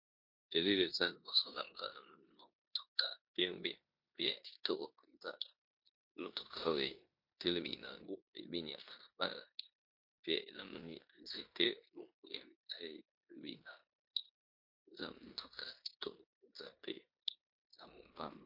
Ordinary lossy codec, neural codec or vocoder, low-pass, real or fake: MP3, 32 kbps; codec, 16 kHz in and 24 kHz out, 0.9 kbps, LongCat-Audio-Codec, four codebook decoder; 5.4 kHz; fake